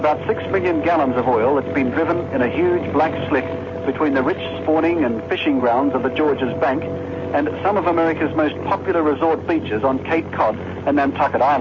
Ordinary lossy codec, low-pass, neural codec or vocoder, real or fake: MP3, 32 kbps; 7.2 kHz; none; real